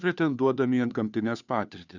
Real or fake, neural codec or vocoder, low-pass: fake; codec, 16 kHz, 2 kbps, FunCodec, trained on Chinese and English, 25 frames a second; 7.2 kHz